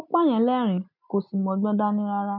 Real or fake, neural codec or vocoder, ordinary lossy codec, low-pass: real; none; none; 5.4 kHz